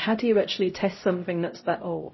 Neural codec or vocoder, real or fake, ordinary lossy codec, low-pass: codec, 16 kHz, 0.5 kbps, X-Codec, HuBERT features, trained on LibriSpeech; fake; MP3, 24 kbps; 7.2 kHz